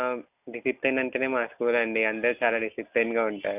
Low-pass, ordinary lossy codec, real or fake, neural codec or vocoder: 3.6 kHz; none; real; none